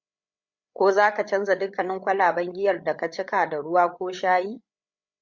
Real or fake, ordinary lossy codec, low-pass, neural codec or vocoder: fake; Opus, 64 kbps; 7.2 kHz; codec, 16 kHz, 8 kbps, FreqCodec, larger model